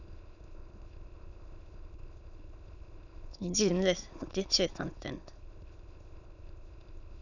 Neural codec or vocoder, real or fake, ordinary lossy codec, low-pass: autoencoder, 22.05 kHz, a latent of 192 numbers a frame, VITS, trained on many speakers; fake; none; 7.2 kHz